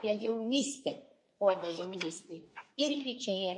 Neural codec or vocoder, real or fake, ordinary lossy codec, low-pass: codec, 24 kHz, 1 kbps, SNAC; fake; MP3, 48 kbps; 10.8 kHz